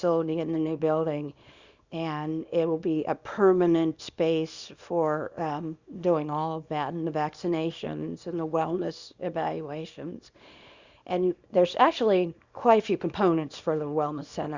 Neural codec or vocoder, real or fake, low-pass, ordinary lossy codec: codec, 24 kHz, 0.9 kbps, WavTokenizer, small release; fake; 7.2 kHz; Opus, 64 kbps